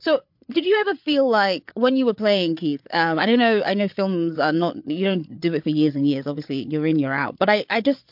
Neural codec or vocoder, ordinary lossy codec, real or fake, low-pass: codec, 16 kHz, 8 kbps, FreqCodec, larger model; MP3, 48 kbps; fake; 5.4 kHz